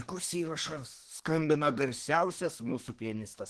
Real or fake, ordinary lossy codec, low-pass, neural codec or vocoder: fake; Opus, 16 kbps; 10.8 kHz; codec, 24 kHz, 1 kbps, SNAC